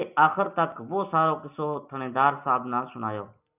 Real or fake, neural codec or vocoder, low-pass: real; none; 3.6 kHz